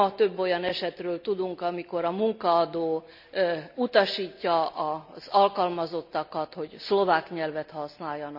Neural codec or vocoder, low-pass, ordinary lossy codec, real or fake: none; 5.4 kHz; none; real